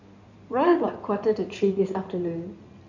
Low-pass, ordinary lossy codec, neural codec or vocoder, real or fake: 7.2 kHz; none; codec, 16 kHz in and 24 kHz out, 2.2 kbps, FireRedTTS-2 codec; fake